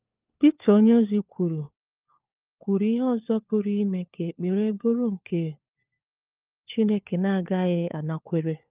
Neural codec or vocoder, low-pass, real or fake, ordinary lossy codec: codec, 16 kHz, 4 kbps, FunCodec, trained on LibriTTS, 50 frames a second; 3.6 kHz; fake; Opus, 24 kbps